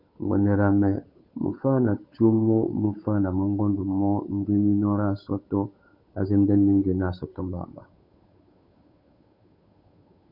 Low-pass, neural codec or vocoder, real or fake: 5.4 kHz; codec, 16 kHz, 8 kbps, FunCodec, trained on Chinese and English, 25 frames a second; fake